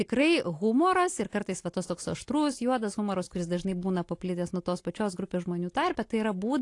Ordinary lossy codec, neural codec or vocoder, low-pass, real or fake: AAC, 48 kbps; none; 10.8 kHz; real